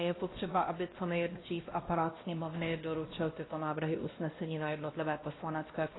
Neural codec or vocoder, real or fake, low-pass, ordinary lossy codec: codec, 16 kHz, 1 kbps, X-Codec, WavLM features, trained on Multilingual LibriSpeech; fake; 7.2 kHz; AAC, 16 kbps